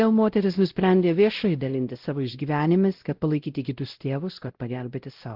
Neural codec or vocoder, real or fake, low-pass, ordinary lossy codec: codec, 16 kHz, 0.5 kbps, X-Codec, WavLM features, trained on Multilingual LibriSpeech; fake; 5.4 kHz; Opus, 16 kbps